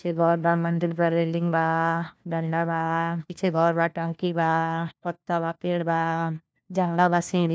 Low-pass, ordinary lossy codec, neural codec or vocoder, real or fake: none; none; codec, 16 kHz, 1 kbps, FunCodec, trained on LibriTTS, 50 frames a second; fake